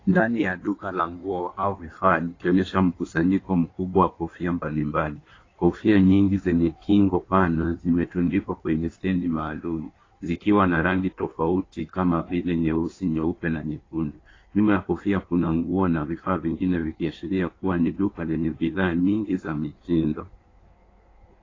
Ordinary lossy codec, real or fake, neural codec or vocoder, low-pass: AAC, 32 kbps; fake; codec, 16 kHz in and 24 kHz out, 1.1 kbps, FireRedTTS-2 codec; 7.2 kHz